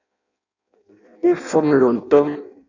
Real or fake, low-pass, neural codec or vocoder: fake; 7.2 kHz; codec, 16 kHz in and 24 kHz out, 0.6 kbps, FireRedTTS-2 codec